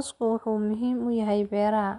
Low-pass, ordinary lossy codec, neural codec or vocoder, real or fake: 10.8 kHz; none; none; real